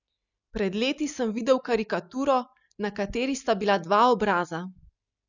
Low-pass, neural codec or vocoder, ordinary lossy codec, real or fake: 7.2 kHz; none; none; real